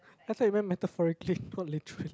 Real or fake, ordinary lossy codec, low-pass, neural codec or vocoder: real; none; none; none